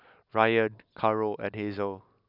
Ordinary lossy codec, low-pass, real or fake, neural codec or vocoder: none; 5.4 kHz; real; none